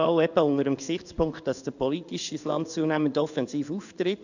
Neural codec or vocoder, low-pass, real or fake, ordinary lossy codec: codec, 16 kHz in and 24 kHz out, 1 kbps, XY-Tokenizer; 7.2 kHz; fake; none